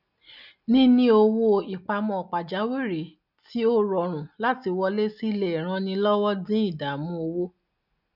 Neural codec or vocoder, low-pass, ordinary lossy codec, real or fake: none; 5.4 kHz; none; real